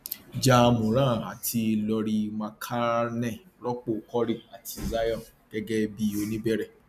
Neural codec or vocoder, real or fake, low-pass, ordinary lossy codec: none; real; 14.4 kHz; none